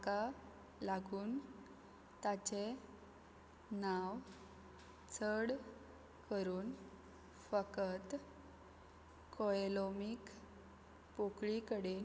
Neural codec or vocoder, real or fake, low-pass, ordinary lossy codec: none; real; none; none